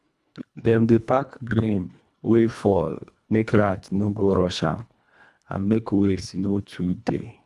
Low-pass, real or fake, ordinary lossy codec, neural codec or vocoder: none; fake; none; codec, 24 kHz, 1.5 kbps, HILCodec